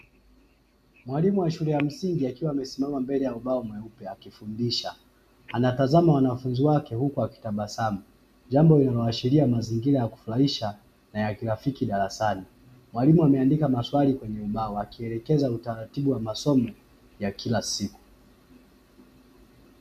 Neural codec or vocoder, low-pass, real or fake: none; 14.4 kHz; real